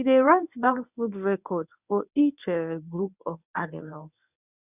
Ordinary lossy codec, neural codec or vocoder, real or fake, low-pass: none; codec, 24 kHz, 0.9 kbps, WavTokenizer, medium speech release version 1; fake; 3.6 kHz